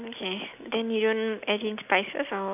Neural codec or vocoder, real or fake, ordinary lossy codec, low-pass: none; real; none; 3.6 kHz